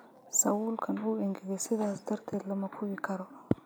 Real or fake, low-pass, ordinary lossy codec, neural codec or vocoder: real; none; none; none